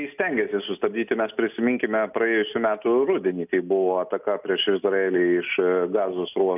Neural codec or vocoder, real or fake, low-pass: none; real; 3.6 kHz